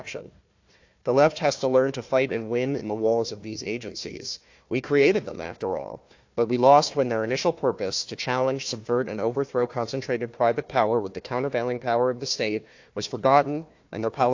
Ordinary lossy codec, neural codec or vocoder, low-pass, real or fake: AAC, 48 kbps; codec, 16 kHz, 1 kbps, FunCodec, trained on Chinese and English, 50 frames a second; 7.2 kHz; fake